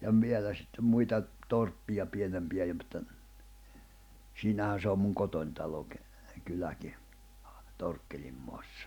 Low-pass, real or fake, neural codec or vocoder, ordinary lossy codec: 19.8 kHz; real; none; none